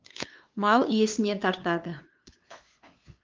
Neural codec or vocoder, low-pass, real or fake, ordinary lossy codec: codec, 16 kHz, 2 kbps, FunCodec, trained on LibriTTS, 25 frames a second; 7.2 kHz; fake; Opus, 24 kbps